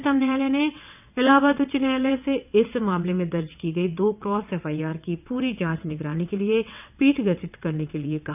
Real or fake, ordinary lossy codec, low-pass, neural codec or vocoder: fake; none; 3.6 kHz; vocoder, 22.05 kHz, 80 mel bands, WaveNeXt